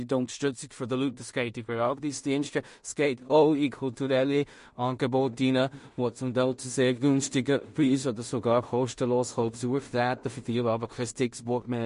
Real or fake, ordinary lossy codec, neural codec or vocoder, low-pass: fake; MP3, 48 kbps; codec, 16 kHz in and 24 kHz out, 0.4 kbps, LongCat-Audio-Codec, two codebook decoder; 10.8 kHz